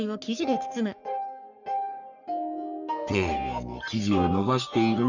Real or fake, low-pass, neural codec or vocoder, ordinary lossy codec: fake; 7.2 kHz; codec, 44.1 kHz, 3.4 kbps, Pupu-Codec; none